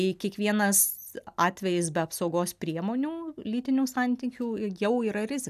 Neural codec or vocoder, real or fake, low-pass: none; real; 14.4 kHz